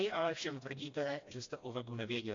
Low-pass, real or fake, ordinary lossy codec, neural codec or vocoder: 7.2 kHz; fake; MP3, 48 kbps; codec, 16 kHz, 1 kbps, FreqCodec, smaller model